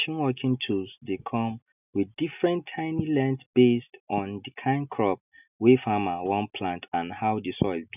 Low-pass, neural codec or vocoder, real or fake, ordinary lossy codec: 3.6 kHz; none; real; none